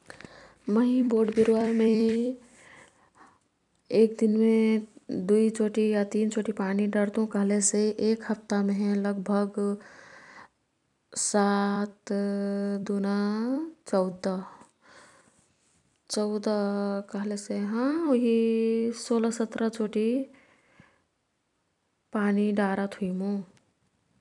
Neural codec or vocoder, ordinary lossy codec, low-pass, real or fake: vocoder, 44.1 kHz, 128 mel bands every 256 samples, BigVGAN v2; none; 10.8 kHz; fake